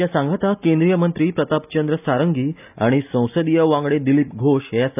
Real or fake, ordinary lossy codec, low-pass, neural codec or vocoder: real; none; 3.6 kHz; none